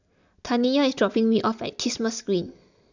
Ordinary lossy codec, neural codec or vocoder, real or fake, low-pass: none; none; real; 7.2 kHz